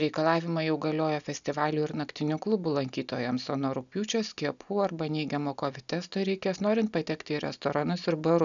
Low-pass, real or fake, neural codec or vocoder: 7.2 kHz; real; none